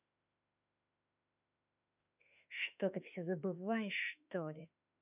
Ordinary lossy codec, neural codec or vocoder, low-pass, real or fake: none; autoencoder, 48 kHz, 32 numbers a frame, DAC-VAE, trained on Japanese speech; 3.6 kHz; fake